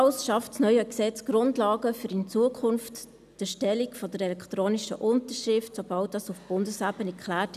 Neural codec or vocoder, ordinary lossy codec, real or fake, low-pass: none; none; real; 14.4 kHz